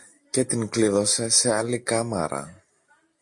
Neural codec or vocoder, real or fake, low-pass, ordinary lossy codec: none; real; 10.8 kHz; MP3, 48 kbps